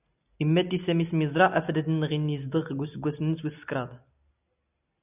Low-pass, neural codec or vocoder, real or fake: 3.6 kHz; none; real